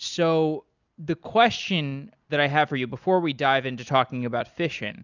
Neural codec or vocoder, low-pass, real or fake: none; 7.2 kHz; real